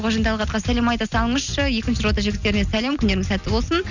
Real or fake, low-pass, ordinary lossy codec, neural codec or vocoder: real; 7.2 kHz; none; none